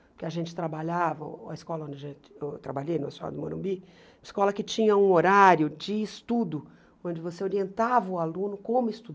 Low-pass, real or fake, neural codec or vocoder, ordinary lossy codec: none; real; none; none